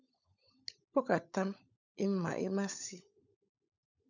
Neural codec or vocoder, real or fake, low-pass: codec, 16 kHz, 16 kbps, FunCodec, trained on LibriTTS, 50 frames a second; fake; 7.2 kHz